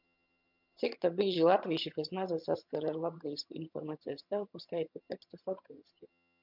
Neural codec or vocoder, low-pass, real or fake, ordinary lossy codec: vocoder, 22.05 kHz, 80 mel bands, HiFi-GAN; 5.4 kHz; fake; MP3, 48 kbps